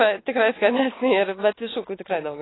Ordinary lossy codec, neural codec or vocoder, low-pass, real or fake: AAC, 16 kbps; none; 7.2 kHz; real